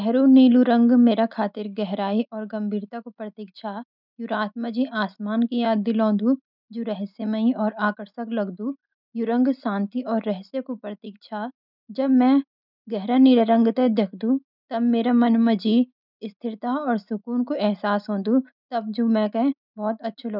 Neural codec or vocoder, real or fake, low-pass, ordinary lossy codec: none; real; 5.4 kHz; none